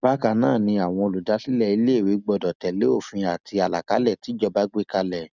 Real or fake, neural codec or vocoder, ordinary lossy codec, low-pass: real; none; none; 7.2 kHz